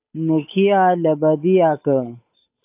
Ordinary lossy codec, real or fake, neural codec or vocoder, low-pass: AAC, 32 kbps; fake; codec, 16 kHz, 8 kbps, FunCodec, trained on Chinese and English, 25 frames a second; 3.6 kHz